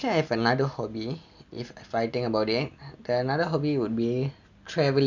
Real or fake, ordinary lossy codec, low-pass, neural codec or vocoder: real; none; 7.2 kHz; none